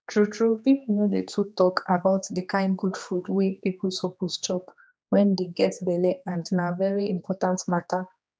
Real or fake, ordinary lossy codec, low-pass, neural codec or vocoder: fake; none; none; codec, 16 kHz, 2 kbps, X-Codec, HuBERT features, trained on general audio